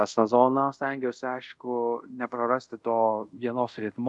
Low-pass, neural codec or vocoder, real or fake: 10.8 kHz; codec, 24 kHz, 0.5 kbps, DualCodec; fake